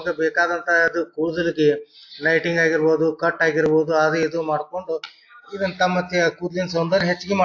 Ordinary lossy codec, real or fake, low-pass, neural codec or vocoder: AAC, 48 kbps; real; 7.2 kHz; none